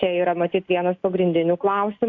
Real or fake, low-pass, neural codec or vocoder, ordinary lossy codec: real; 7.2 kHz; none; AAC, 48 kbps